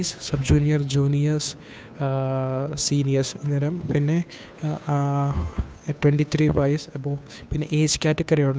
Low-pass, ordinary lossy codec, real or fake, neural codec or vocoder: none; none; fake; codec, 16 kHz, 2 kbps, FunCodec, trained on Chinese and English, 25 frames a second